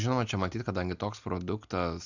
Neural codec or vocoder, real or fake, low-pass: none; real; 7.2 kHz